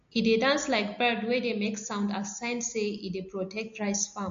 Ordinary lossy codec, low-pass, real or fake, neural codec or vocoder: MP3, 48 kbps; 7.2 kHz; real; none